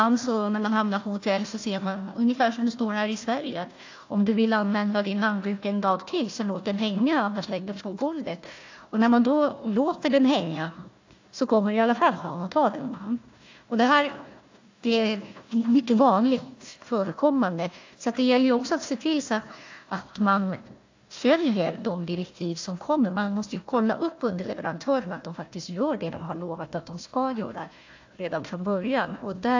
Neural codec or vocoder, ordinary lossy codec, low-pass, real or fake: codec, 16 kHz, 1 kbps, FunCodec, trained on Chinese and English, 50 frames a second; AAC, 48 kbps; 7.2 kHz; fake